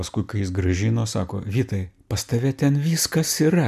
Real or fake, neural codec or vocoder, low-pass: real; none; 14.4 kHz